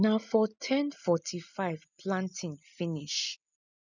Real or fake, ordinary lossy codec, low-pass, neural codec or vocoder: real; none; 7.2 kHz; none